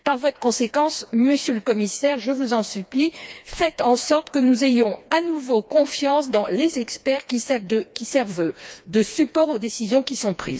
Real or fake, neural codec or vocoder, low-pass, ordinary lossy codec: fake; codec, 16 kHz, 2 kbps, FreqCodec, smaller model; none; none